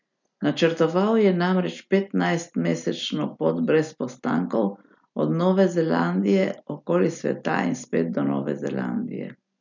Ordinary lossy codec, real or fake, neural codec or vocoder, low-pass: none; real; none; 7.2 kHz